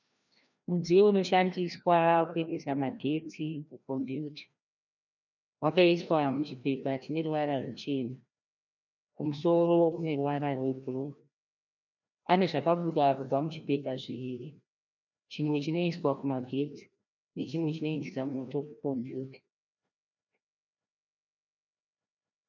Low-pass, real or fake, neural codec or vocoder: 7.2 kHz; fake; codec, 16 kHz, 1 kbps, FreqCodec, larger model